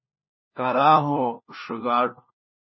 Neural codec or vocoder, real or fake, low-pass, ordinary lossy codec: codec, 16 kHz, 1 kbps, FunCodec, trained on LibriTTS, 50 frames a second; fake; 7.2 kHz; MP3, 24 kbps